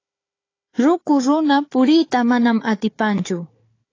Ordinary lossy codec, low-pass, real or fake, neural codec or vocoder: AAC, 32 kbps; 7.2 kHz; fake; codec, 16 kHz, 4 kbps, FunCodec, trained on Chinese and English, 50 frames a second